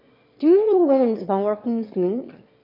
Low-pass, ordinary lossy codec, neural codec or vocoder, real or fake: 5.4 kHz; MP3, 32 kbps; autoencoder, 22.05 kHz, a latent of 192 numbers a frame, VITS, trained on one speaker; fake